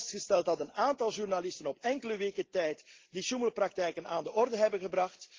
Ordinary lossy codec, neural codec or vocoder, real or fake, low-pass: Opus, 16 kbps; none; real; 7.2 kHz